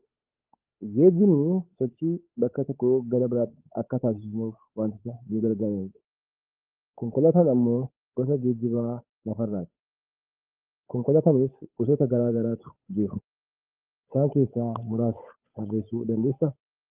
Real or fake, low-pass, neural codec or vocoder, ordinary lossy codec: fake; 3.6 kHz; codec, 16 kHz, 8 kbps, FunCodec, trained on Chinese and English, 25 frames a second; Opus, 24 kbps